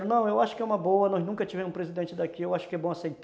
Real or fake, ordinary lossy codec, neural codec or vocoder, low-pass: real; none; none; none